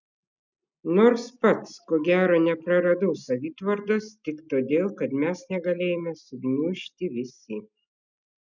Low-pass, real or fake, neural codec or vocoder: 7.2 kHz; real; none